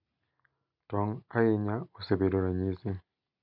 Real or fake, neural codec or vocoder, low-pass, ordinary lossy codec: real; none; 5.4 kHz; none